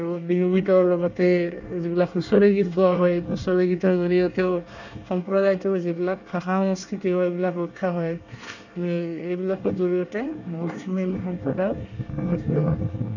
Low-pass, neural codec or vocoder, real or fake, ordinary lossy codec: 7.2 kHz; codec, 24 kHz, 1 kbps, SNAC; fake; none